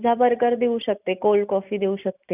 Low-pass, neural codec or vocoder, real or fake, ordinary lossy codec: 3.6 kHz; none; real; none